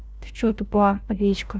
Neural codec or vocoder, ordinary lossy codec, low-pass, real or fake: codec, 16 kHz, 1 kbps, FunCodec, trained on LibriTTS, 50 frames a second; none; none; fake